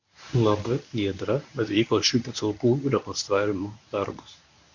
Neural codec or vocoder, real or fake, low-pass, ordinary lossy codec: codec, 24 kHz, 0.9 kbps, WavTokenizer, medium speech release version 1; fake; 7.2 kHz; MP3, 64 kbps